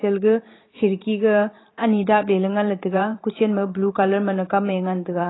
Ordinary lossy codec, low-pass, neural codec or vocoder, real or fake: AAC, 16 kbps; 7.2 kHz; vocoder, 44.1 kHz, 128 mel bands every 512 samples, BigVGAN v2; fake